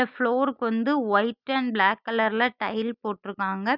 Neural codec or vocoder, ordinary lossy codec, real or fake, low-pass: none; none; real; 5.4 kHz